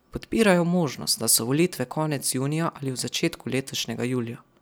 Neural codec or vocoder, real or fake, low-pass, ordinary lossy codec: none; real; none; none